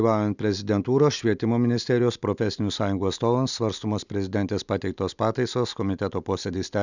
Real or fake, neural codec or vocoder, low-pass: real; none; 7.2 kHz